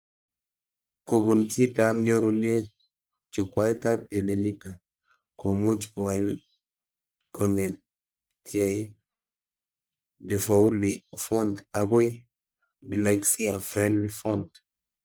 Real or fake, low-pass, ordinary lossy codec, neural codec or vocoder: fake; none; none; codec, 44.1 kHz, 1.7 kbps, Pupu-Codec